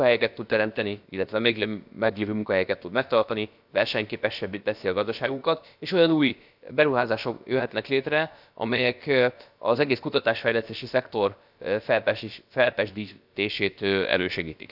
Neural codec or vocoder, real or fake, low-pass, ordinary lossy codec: codec, 16 kHz, about 1 kbps, DyCAST, with the encoder's durations; fake; 5.4 kHz; none